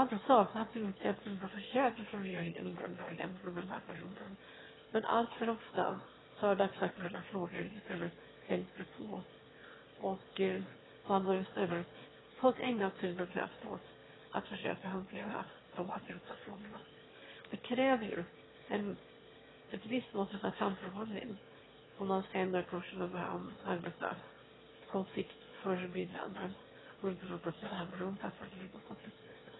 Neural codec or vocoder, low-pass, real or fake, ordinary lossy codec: autoencoder, 22.05 kHz, a latent of 192 numbers a frame, VITS, trained on one speaker; 7.2 kHz; fake; AAC, 16 kbps